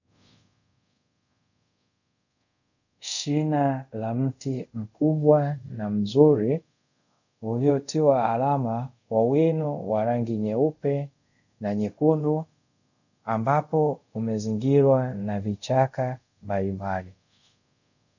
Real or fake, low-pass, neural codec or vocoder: fake; 7.2 kHz; codec, 24 kHz, 0.5 kbps, DualCodec